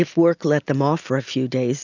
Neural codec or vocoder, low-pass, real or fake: none; 7.2 kHz; real